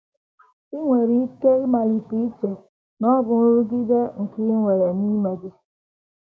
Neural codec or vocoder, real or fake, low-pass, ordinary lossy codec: codec, 16 kHz, 6 kbps, DAC; fake; none; none